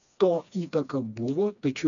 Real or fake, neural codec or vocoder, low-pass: fake; codec, 16 kHz, 2 kbps, FreqCodec, smaller model; 7.2 kHz